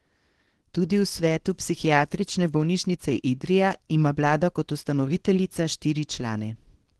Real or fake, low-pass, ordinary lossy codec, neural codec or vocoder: fake; 10.8 kHz; Opus, 16 kbps; codec, 24 kHz, 0.9 kbps, WavTokenizer, small release